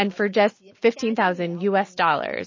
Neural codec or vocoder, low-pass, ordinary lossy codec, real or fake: none; 7.2 kHz; MP3, 32 kbps; real